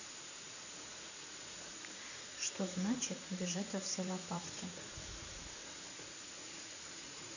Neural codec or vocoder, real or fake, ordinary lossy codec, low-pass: vocoder, 44.1 kHz, 80 mel bands, Vocos; fake; none; 7.2 kHz